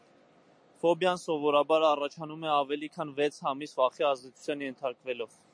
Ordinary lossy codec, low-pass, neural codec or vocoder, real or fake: MP3, 48 kbps; 9.9 kHz; none; real